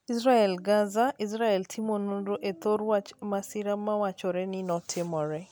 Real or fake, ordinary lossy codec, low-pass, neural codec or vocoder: real; none; none; none